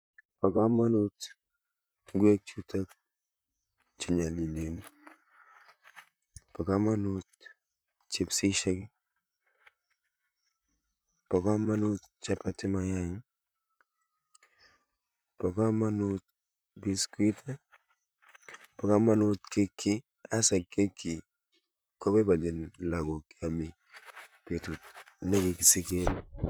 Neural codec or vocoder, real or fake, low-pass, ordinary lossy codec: vocoder, 44.1 kHz, 128 mel bands, Pupu-Vocoder; fake; none; none